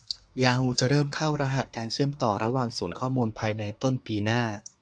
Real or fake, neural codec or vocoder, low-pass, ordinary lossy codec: fake; codec, 24 kHz, 1 kbps, SNAC; 9.9 kHz; AAC, 64 kbps